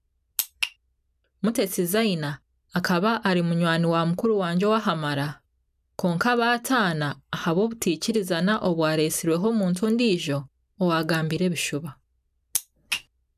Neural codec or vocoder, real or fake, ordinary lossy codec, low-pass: none; real; none; 14.4 kHz